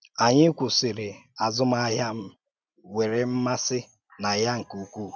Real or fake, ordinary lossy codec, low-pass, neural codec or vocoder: real; none; none; none